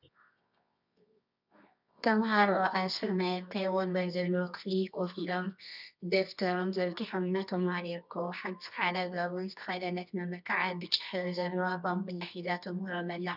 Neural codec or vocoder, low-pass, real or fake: codec, 24 kHz, 0.9 kbps, WavTokenizer, medium music audio release; 5.4 kHz; fake